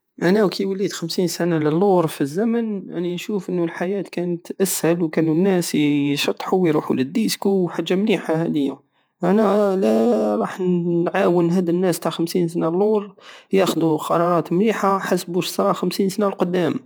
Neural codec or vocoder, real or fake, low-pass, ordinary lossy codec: vocoder, 48 kHz, 128 mel bands, Vocos; fake; none; none